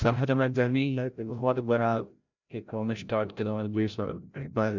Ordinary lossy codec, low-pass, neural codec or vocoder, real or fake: none; 7.2 kHz; codec, 16 kHz, 0.5 kbps, FreqCodec, larger model; fake